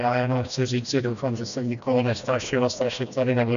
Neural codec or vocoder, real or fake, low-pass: codec, 16 kHz, 1 kbps, FreqCodec, smaller model; fake; 7.2 kHz